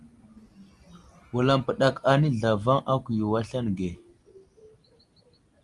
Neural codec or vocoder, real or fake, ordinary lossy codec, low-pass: none; real; Opus, 32 kbps; 10.8 kHz